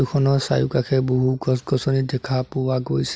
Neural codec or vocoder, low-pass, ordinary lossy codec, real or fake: none; none; none; real